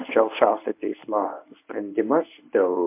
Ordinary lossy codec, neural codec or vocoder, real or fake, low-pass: MP3, 32 kbps; codec, 24 kHz, 0.9 kbps, WavTokenizer, medium speech release version 1; fake; 3.6 kHz